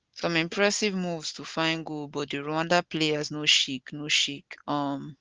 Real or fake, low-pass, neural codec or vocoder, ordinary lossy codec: real; 7.2 kHz; none; Opus, 16 kbps